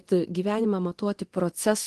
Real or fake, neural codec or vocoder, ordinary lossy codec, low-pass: fake; codec, 24 kHz, 0.9 kbps, DualCodec; Opus, 16 kbps; 10.8 kHz